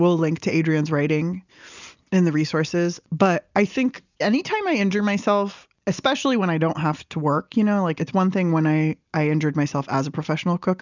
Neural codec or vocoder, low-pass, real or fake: none; 7.2 kHz; real